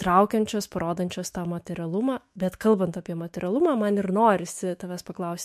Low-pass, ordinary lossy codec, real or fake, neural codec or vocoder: 14.4 kHz; MP3, 64 kbps; fake; autoencoder, 48 kHz, 128 numbers a frame, DAC-VAE, trained on Japanese speech